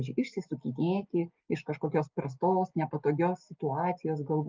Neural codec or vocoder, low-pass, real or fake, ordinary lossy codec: none; 7.2 kHz; real; Opus, 32 kbps